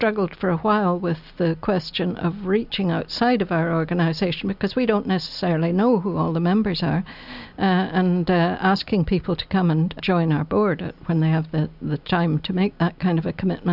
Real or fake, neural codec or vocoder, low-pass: real; none; 5.4 kHz